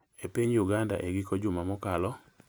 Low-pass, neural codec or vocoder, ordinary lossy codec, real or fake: none; none; none; real